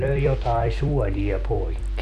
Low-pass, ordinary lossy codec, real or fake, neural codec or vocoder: 14.4 kHz; none; fake; vocoder, 44.1 kHz, 128 mel bands every 256 samples, BigVGAN v2